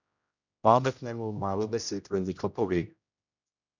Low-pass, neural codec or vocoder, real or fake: 7.2 kHz; codec, 16 kHz, 0.5 kbps, X-Codec, HuBERT features, trained on general audio; fake